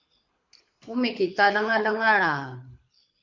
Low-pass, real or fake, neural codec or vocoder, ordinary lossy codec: 7.2 kHz; fake; codec, 24 kHz, 6 kbps, HILCodec; MP3, 48 kbps